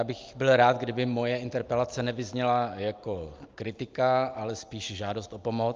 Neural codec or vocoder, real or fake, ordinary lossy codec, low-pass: none; real; Opus, 24 kbps; 7.2 kHz